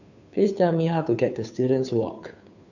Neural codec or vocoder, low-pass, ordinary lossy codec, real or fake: codec, 16 kHz, 2 kbps, FunCodec, trained on Chinese and English, 25 frames a second; 7.2 kHz; none; fake